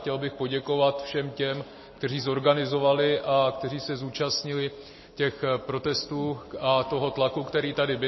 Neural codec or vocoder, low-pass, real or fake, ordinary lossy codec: none; 7.2 kHz; real; MP3, 24 kbps